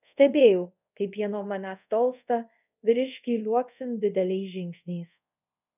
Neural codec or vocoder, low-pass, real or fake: codec, 24 kHz, 0.5 kbps, DualCodec; 3.6 kHz; fake